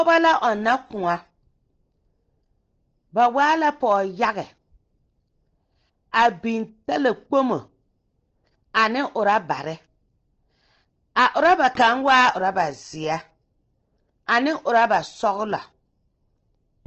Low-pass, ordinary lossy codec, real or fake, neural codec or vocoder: 7.2 kHz; Opus, 16 kbps; real; none